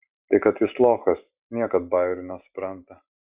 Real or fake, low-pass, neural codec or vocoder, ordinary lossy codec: real; 3.6 kHz; none; Opus, 64 kbps